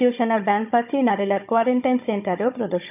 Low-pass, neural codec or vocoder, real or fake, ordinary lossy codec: 3.6 kHz; codec, 16 kHz, 16 kbps, FunCodec, trained on Chinese and English, 50 frames a second; fake; none